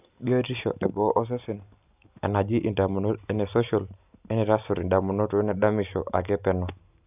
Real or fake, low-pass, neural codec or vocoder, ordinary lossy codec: real; 3.6 kHz; none; none